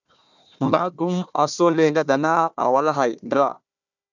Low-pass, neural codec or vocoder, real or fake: 7.2 kHz; codec, 16 kHz, 1 kbps, FunCodec, trained on Chinese and English, 50 frames a second; fake